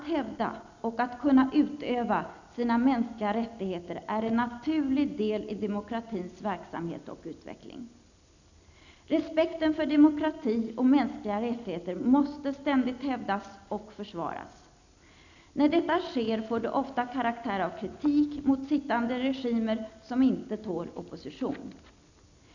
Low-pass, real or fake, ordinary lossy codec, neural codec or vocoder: 7.2 kHz; real; none; none